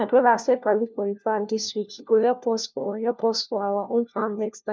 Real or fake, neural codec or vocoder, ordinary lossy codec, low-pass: fake; codec, 16 kHz, 1 kbps, FunCodec, trained on LibriTTS, 50 frames a second; none; none